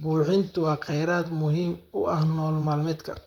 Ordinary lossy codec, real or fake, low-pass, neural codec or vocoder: none; fake; 19.8 kHz; vocoder, 44.1 kHz, 128 mel bands, Pupu-Vocoder